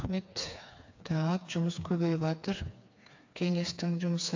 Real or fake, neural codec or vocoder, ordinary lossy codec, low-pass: fake; codec, 16 kHz, 4 kbps, FreqCodec, smaller model; AAC, 48 kbps; 7.2 kHz